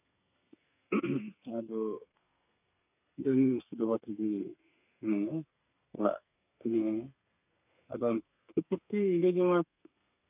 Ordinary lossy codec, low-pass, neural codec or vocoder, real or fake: none; 3.6 kHz; codec, 32 kHz, 1.9 kbps, SNAC; fake